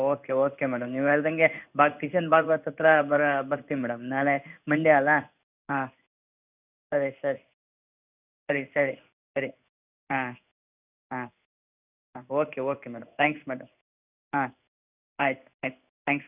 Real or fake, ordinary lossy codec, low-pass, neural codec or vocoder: fake; none; 3.6 kHz; codec, 16 kHz in and 24 kHz out, 1 kbps, XY-Tokenizer